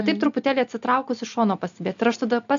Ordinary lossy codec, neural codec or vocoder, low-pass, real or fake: AAC, 64 kbps; none; 7.2 kHz; real